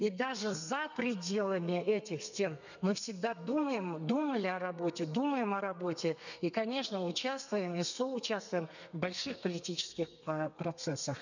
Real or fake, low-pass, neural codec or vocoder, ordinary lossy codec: fake; 7.2 kHz; codec, 32 kHz, 1.9 kbps, SNAC; none